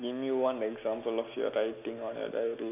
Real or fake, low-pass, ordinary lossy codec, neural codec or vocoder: real; 3.6 kHz; none; none